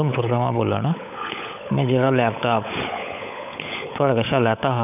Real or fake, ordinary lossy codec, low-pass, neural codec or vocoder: fake; none; 3.6 kHz; codec, 16 kHz, 16 kbps, FunCodec, trained on LibriTTS, 50 frames a second